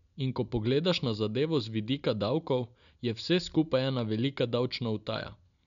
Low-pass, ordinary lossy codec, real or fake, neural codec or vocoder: 7.2 kHz; none; real; none